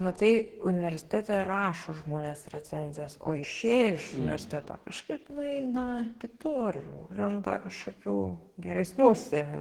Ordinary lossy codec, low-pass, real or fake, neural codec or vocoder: Opus, 16 kbps; 19.8 kHz; fake; codec, 44.1 kHz, 2.6 kbps, DAC